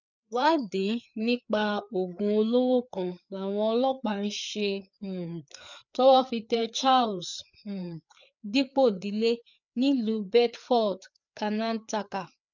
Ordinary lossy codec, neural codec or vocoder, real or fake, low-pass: none; codec, 16 kHz, 4 kbps, FreqCodec, larger model; fake; 7.2 kHz